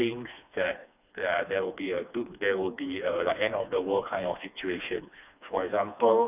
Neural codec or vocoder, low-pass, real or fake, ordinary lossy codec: codec, 16 kHz, 2 kbps, FreqCodec, smaller model; 3.6 kHz; fake; none